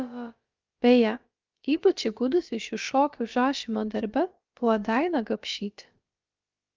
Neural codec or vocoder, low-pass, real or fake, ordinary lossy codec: codec, 16 kHz, about 1 kbps, DyCAST, with the encoder's durations; 7.2 kHz; fake; Opus, 24 kbps